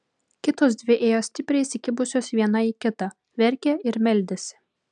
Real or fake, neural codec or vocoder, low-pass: real; none; 9.9 kHz